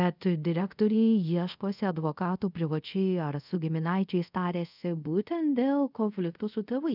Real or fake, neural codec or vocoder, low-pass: fake; codec, 24 kHz, 0.5 kbps, DualCodec; 5.4 kHz